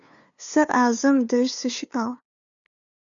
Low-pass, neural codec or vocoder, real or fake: 7.2 kHz; codec, 16 kHz, 2 kbps, FunCodec, trained on LibriTTS, 25 frames a second; fake